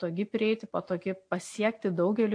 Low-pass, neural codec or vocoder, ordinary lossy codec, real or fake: 9.9 kHz; none; AAC, 48 kbps; real